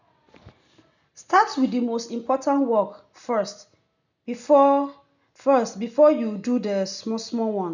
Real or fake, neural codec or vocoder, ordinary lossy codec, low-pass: real; none; none; 7.2 kHz